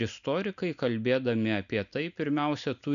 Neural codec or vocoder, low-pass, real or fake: none; 7.2 kHz; real